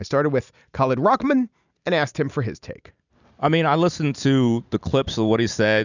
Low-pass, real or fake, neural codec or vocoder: 7.2 kHz; real; none